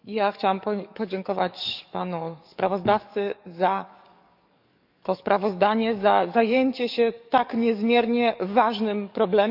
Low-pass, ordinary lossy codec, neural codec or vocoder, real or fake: 5.4 kHz; none; codec, 44.1 kHz, 7.8 kbps, DAC; fake